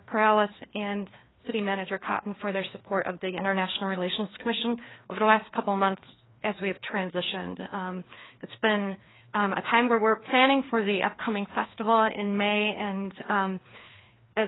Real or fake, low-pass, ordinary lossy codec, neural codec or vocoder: fake; 7.2 kHz; AAC, 16 kbps; codec, 16 kHz, 2 kbps, FreqCodec, larger model